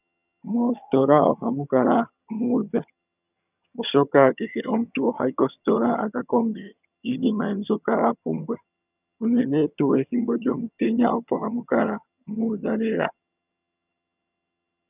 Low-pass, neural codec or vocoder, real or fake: 3.6 kHz; vocoder, 22.05 kHz, 80 mel bands, HiFi-GAN; fake